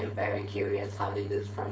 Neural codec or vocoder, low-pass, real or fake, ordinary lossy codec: codec, 16 kHz, 4.8 kbps, FACodec; none; fake; none